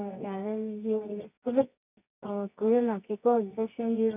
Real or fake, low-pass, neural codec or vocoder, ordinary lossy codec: fake; 3.6 kHz; codec, 24 kHz, 0.9 kbps, WavTokenizer, medium music audio release; none